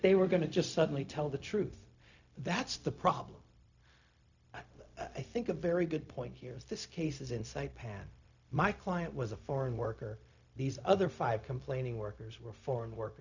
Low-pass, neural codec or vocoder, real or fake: 7.2 kHz; codec, 16 kHz, 0.4 kbps, LongCat-Audio-Codec; fake